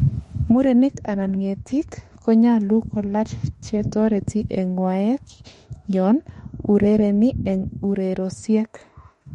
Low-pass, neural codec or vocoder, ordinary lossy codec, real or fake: 19.8 kHz; autoencoder, 48 kHz, 32 numbers a frame, DAC-VAE, trained on Japanese speech; MP3, 48 kbps; fake